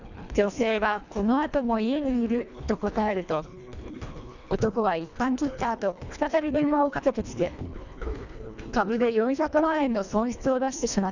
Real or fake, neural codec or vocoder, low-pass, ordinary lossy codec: fake; codec, 24 kHz, 1.5 kbps, HILCodec; 7.2 kHz; none